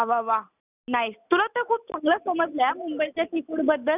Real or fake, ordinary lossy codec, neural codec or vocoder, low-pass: real; none; none; 3.6 kHz